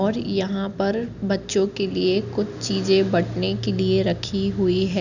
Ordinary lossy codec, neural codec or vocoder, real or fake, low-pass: none; none; real; 7.2 kHz